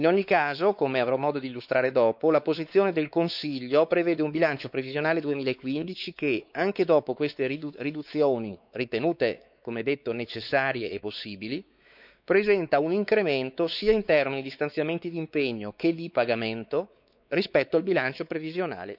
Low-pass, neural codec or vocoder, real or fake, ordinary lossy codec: 5.4 kHz; codec, 16 kHz, 4 kbps, X-Codec, WavLM features, trained on Multilingual LibriSpeech; fake; none